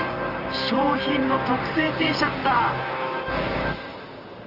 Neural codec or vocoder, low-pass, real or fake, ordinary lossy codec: vocoder, 44.1 kHz, 128 mel bands, Pupu-Vocoder; 5.4 kHz; fake; Opus, 24 kbps